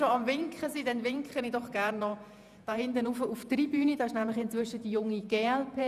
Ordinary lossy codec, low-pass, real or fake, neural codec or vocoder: none; 14.4 kHz; fake; vocoder, 48 kHz, 128 mel bands, Vocos